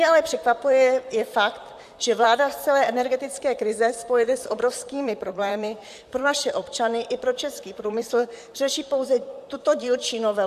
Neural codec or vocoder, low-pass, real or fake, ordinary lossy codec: vocoder, 44.1 kHz, 128 mel bands, Pupu-Vocoder; 14.4 kHz; fake; AAC, 96 kbps